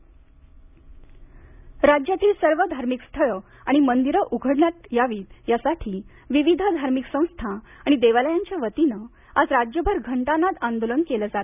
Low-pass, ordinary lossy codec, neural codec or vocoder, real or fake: 3.6 kHz; none; none; real